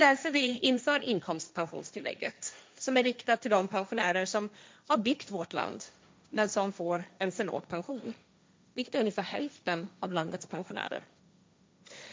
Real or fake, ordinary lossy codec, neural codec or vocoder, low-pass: fake; none; codec, 16 kHz, 1.1 kbps, Voila-Tokenizer; none